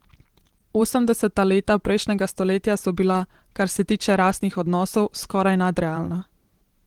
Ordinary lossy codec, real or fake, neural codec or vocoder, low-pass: Opus, 16 kbps; fake; vocoder, 44.1 kHz, 128 mel bands every 512 samples, BigVGAN v2; 19.8 kHz